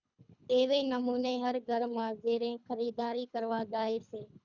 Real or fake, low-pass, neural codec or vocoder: fake; 7.2 kHz; codec, 24 kHz, 3 kbps, HILCodec